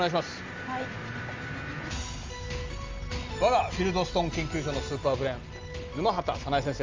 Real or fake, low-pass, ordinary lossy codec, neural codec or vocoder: real; 7.2 kHz; Opus, 32 kbps; none